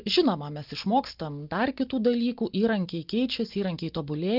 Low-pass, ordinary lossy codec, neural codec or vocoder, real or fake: 5.4 kHz; Opus, 24 kbps; none; real